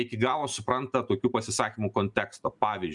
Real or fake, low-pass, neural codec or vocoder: real; 10.8 kHz; none